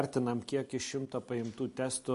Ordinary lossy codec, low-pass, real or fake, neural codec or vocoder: MP3, 48 kbps; 10.8 kHz; real; none